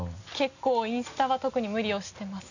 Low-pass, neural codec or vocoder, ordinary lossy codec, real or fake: 7.2 kHz; none; none; real